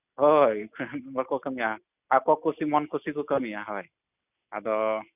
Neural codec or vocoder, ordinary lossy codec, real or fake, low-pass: none; none; real; 3.6 kHz